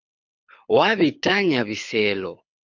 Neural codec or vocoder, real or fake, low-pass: codec, 24 kHz, 6 kbps, HILCodec; fake; 7.2 kHz